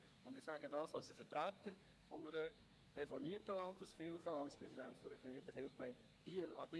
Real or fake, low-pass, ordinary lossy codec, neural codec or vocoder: fake; none; none; codec, 24 kHz, 1 kbps, SNAC